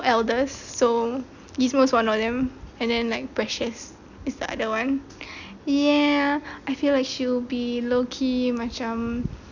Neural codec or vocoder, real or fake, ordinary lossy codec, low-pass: none; real; none; 7.2 kHz